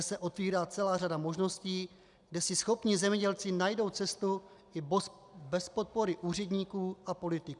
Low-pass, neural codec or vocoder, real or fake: 10.8 kHz; none; real